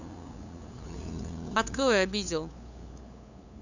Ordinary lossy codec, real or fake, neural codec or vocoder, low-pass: none; fake; codec, 16 kHz, 8 kbps, FunCodec, trained on LibriTTS, 25 frames a second; 7.2 kHz